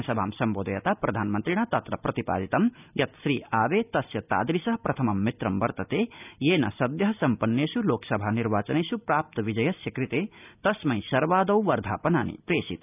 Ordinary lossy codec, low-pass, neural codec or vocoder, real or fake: none; 3.6 kHz; none; real